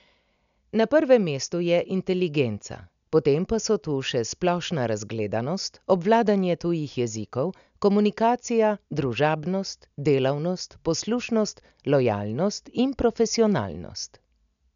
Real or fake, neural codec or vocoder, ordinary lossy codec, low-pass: real; none; none; 7.2 kHz